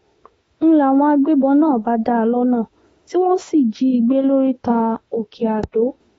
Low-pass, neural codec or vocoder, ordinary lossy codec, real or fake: 19.8 kHz; autoencoder, 48 kHz, 32 numbers a frame, DAC-VAE, trained on Japanese speech; AAC, 24 kbps; fake